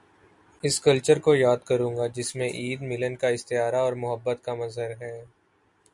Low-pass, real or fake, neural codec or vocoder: 10.8 kHz; real; none